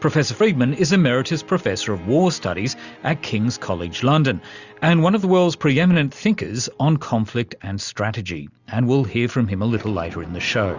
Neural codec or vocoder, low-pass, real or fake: none; 7.2 kHz; real